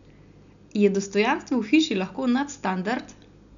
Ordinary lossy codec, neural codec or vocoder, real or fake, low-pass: none; none; real; 7.2 kHz